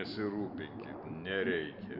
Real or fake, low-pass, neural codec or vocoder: real; 5.4 kHz; none